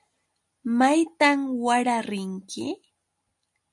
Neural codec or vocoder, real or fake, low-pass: none; real; 10.8 kHz